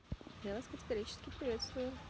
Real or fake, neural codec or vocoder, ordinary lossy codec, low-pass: real; none; none; none